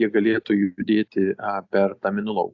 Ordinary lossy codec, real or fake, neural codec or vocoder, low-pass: AAC, 48 kbps; real; none; 7.2 kHz